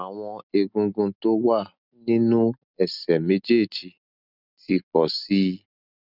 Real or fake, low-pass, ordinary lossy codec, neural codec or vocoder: real; 5.4 kHz; none; none